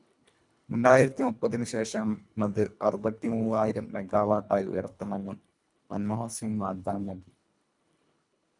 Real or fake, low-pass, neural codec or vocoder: fake; 10.8 kHz; codec, 24 kHz, 1.5 kbps, HILCodec